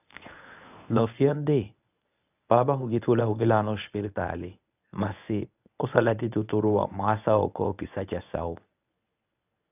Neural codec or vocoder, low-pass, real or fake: codec, 24 kHz, 0.9 kbps, WavTokenizer, medium speech release version 1; 3.6 kHz; fake